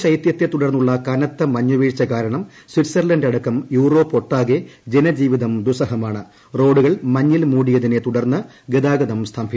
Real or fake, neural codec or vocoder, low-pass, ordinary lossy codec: real; none; none; none